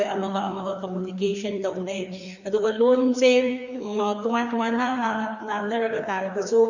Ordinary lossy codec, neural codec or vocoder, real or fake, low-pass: none; codec, 16 kHz, 2 kbps, FreqCodec, larger model; fake; 7.2 kHz